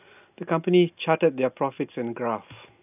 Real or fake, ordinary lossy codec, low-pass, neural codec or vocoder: real; none; 3.6 kHz; none